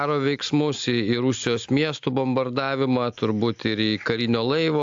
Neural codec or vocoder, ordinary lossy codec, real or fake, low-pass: none; AAC, 64 kbps; real; 7.2 kHz